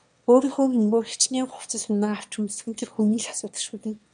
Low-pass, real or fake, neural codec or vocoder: 9.9 kHz; fake; autoencoder, 22.05 kHz, a latent of 192 numbers a frame, VITS, trained on one speaker